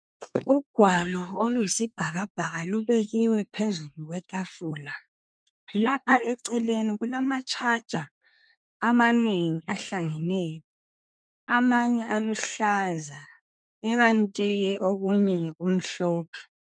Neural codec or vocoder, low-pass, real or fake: codec, 24 kHz, 1 kbps, SNAC; 9.9 kHz; fake